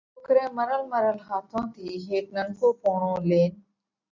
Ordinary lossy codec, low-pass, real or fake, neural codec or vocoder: MP3, 64 kbps; 7.2 kHz; real; none